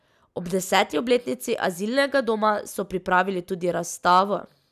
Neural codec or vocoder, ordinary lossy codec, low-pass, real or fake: none; none; 14.4 kHz; real